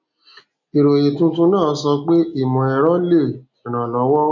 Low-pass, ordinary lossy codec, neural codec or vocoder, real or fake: 7.2 kHz; none; none; real